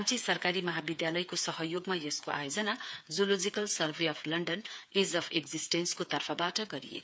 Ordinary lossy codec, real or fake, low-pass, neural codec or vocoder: none; fake; none; codec, 16 kHz, 8 kbps, FreqCodec, smaller model